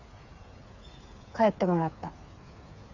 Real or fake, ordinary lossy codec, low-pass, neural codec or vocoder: fake; none; 7.2 kHz; codec, 16 kHz, 8 kbps, FreqCodec, smaller model